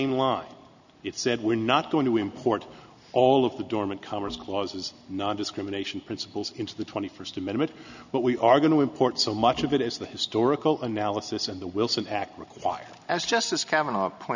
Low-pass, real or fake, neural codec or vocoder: 7.2 kHz; real; none